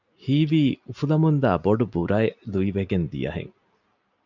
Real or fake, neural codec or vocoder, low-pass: real; none; 7.2 kHz